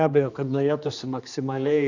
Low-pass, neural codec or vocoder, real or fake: 7.2 kHz; codec, 16 kHz, 2 kbps, X-Codec, HuBERT features, trained on general audio; fake